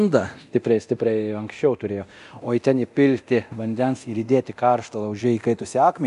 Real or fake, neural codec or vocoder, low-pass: fake; codec, 24 kHz, 0.9 kbps, DualCodec; 10.8 kHz